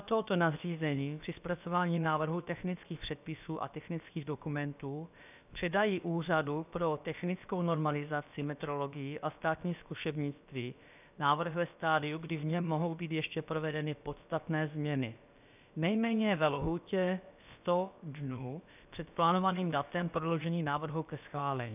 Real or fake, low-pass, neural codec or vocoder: fake; 3.6 kHz; codec, 16 kHz, about 1 kbps, DyCAST, with the encoder's durations